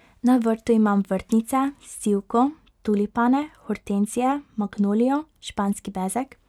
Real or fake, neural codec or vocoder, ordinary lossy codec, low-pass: real; none; none; 19.8 kHz